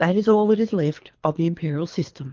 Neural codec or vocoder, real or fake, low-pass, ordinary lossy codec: codec, 24 kHz, 3 kbps, HILCodec; fake; 7.2 kHz; Opus, 24 kbps